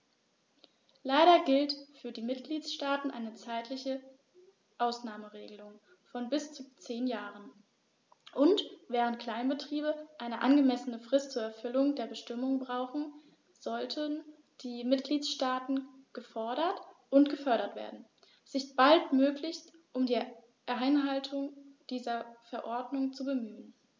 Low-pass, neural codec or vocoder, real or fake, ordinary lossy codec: none; none; real; none